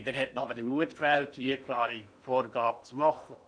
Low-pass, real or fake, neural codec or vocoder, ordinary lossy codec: 9.9 kHz; fake; codec, 16 kHz in and 24 kHz out, 0.8 kbps, FocalCodec, streaming, 65536 codes; none